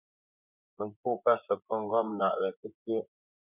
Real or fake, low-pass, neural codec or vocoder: fake; 3.6 kHz; vocoder, 24 kHz, 100 mel bands, Vocos